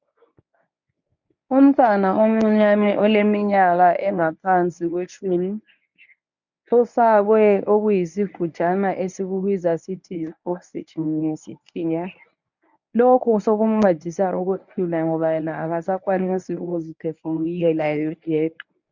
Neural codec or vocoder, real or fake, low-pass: codec, 24 kHz, 0.9 kbps, WavTokenizer, medium speech release version 1; fake; 7.2 kHz